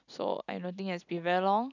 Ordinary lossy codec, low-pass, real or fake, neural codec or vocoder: none; 7.2 kHz; real; none